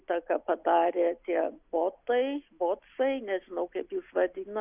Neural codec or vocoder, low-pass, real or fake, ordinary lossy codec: none; 3.6 kHz; real; Opus, 64 kbps